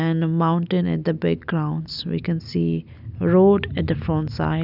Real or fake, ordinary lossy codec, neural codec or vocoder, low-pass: real; none; none; 5.4 kHz